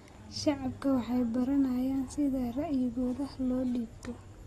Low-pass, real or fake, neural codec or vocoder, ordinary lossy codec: 19.8 kHz; real; none; AAC, 32 kbps